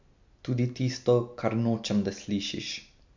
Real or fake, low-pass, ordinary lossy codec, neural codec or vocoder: real; 7.2 kHz; MP3, 64 kbps; none